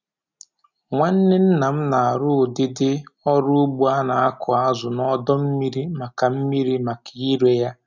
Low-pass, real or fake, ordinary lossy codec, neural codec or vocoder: 7.2 kHz; real; none; none